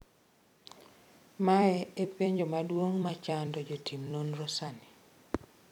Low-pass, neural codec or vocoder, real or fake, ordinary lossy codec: 19.8 kHz; vocoder, 44.1 kHz, 128 mel bands every 256 samples, BigVGAN v2; fake; none